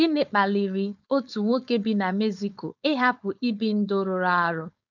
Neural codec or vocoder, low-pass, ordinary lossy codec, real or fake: codec, 16 kHz, 4.8 kbps, FACodec; 7.2 kHz; none; fake